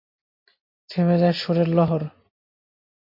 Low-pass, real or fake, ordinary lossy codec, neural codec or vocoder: 5.4 kHz; real; MP3, 32 kbps; none